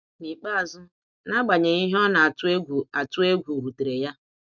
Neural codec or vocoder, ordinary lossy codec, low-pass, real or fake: none; none; 7.2 kHz; real